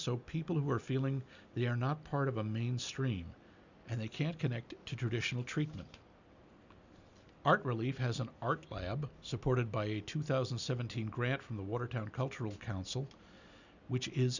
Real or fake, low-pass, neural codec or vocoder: real; 7.2 kHz; none